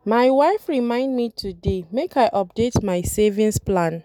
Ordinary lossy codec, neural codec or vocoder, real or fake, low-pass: none; none; real; 19.8 kHz